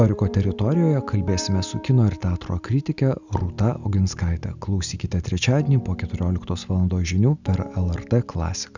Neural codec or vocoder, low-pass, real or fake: none; 7.2 kHz; real